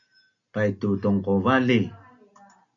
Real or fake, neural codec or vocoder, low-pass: real; none; 7.2 kHz